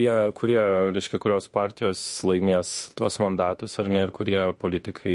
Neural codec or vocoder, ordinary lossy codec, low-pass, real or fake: autoencoder, 48 kHz, 32 numbers a frame, DAC-VAE, trained on Japanese speech; MP3, 48 kbps; 14.4 kHz; fake